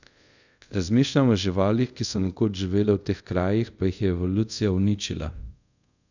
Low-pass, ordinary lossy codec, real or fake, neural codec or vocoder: 7.2 kHz; none; fake; codec, 24 kHz, 0.5 kbps, DualCodec